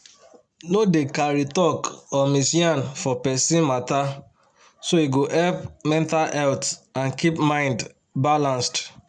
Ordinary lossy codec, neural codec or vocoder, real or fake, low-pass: none; none; real; 9.9 kHz